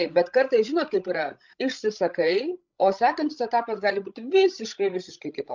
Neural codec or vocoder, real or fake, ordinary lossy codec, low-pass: codec, 16 kHz, 16 kbps, FreqCodec, larger model; fake; MP3, 64 kbps; 7.2 kHz